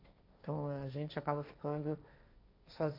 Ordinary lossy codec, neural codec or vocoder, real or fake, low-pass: none; codec, 16 kHz, 1.1 kbps, Voila-Tokenizer; fake; 5.4 kHz